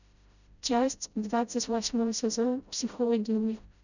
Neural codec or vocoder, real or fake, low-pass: codec, 16 kHz, 0.5 kbps, FreqCodec, smaller model; fake; 7.2 kHz